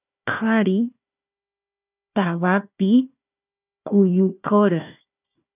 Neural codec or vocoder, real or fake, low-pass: codec, 16 kHz, 1 kbps, FunCodec, trained on Chinese and English, 50 frames a second; fake; 3.6 kHz